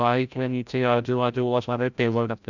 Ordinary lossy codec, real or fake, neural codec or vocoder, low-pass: none; fake; codec, 16 kHz, 0.5 kbps, FreqCodec, larger model; 7.2 kHz